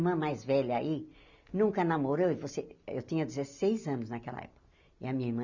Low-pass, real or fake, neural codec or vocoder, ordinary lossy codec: 7.2 kHz; real; none; none